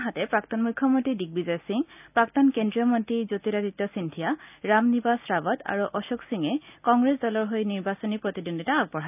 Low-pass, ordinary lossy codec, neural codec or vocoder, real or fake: 3.6 kHz; none; none; real